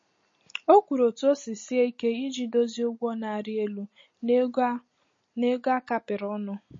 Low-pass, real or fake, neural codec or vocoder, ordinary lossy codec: 7.2 kHz; real; none; MP3, 32 kbps